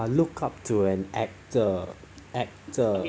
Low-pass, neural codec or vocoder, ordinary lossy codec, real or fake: none; none; none; real